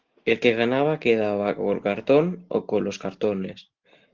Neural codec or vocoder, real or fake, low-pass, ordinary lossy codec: none; real; 7.2 kHz; Opus, 16 kbps